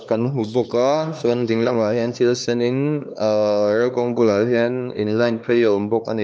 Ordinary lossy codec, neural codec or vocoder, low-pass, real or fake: none; codec, 16 kHz, 2 kbps, X-Codec, HuBERT features, trained on LibriSpeech; none; fake